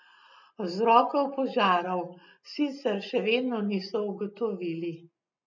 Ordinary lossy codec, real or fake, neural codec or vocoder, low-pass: none; real; none; 7.2 kHz